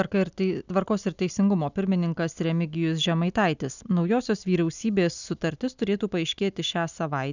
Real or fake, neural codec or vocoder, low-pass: real; none; 7.2 kHz